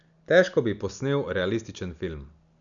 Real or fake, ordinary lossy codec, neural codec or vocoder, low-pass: real; none; none; 7.2 kHz